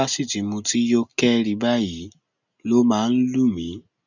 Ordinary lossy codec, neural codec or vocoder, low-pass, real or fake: none; none; 7.2 kHz; real